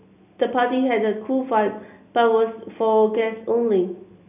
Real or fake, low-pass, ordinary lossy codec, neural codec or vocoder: real; 3.6 kHz; none; none